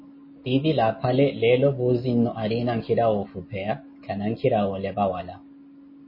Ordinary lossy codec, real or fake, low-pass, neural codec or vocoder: MP3, 24 kbps; real; 5.4 kHz; none